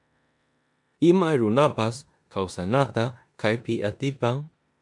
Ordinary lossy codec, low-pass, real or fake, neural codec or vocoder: MP3, 96 kbps; 10.8 kHz; fake; codec, 16 kHz in and 24 kHz out, 0.9 kbps, LongCat-Audio-Codec, four codebook decoder